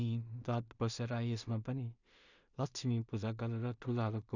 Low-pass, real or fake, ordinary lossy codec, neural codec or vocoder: 7.2 kHz; fake; none; codec, 16 kHz in and 24 kHz out, 0.4 kbps, LongCat-Audio-Codec, two codebook decoder